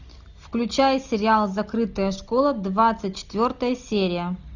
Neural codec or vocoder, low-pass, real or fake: none; 7.2 kHz; real